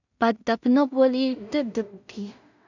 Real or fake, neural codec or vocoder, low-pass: fake; codec, 16 kHz in and 24 kHz out, 0.4 kbps, LongCat-Audio-Codec, two codebook decoder; 7.2 kHz